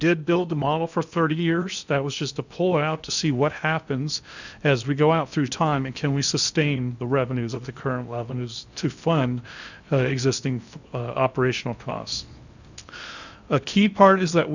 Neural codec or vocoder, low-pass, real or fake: codec, 16 kHz in and 24 kHz out, 0.8 kbps, FocalCodec, streaming, 65536 codes; 7.2 kHz; fake